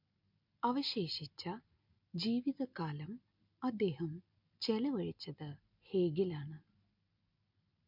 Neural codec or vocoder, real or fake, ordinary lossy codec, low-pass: none; real; MP3, 48 kbps; 5.4 kHz